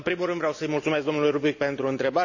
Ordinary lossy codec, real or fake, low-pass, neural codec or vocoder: none; real; 7.2 kHz; none